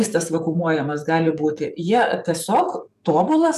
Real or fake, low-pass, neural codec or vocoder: fake; 14.4 kHz; codec, 44.1 kHz, 7.8 kbps, DAC